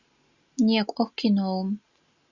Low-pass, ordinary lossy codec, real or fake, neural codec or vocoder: 7.2 kHz; Opus, 64 kbps; real; none